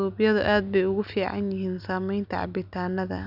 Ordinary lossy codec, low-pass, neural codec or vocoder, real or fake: none; 5.4 kHz; none; real